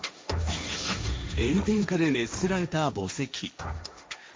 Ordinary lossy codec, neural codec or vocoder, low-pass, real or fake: none; codec, 16 kHz, 1.1 kbps, Voila-Tokenizer; none; fake